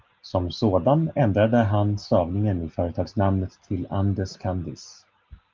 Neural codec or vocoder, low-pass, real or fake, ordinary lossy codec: none; 7.2 kHz; real; Opus, 32 kbps